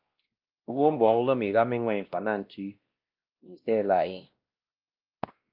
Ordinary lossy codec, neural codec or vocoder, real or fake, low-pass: Opus, 32 kbps; codec, 16 kHz, 1 kbps, X-Codec, WavLM features, trained on Multilingual LibriSpeech; fake; 5.4 kHz